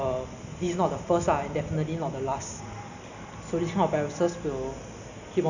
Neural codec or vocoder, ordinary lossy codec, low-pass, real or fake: none; none; 7.2 kHz; real